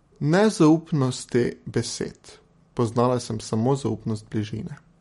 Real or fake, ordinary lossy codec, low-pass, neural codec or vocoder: real; MP3, 48 kbps; 19.8 kHz; none